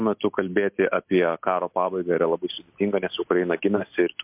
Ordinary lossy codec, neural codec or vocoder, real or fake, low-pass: MP3, 32 kbps; none; real; 3.6 kHz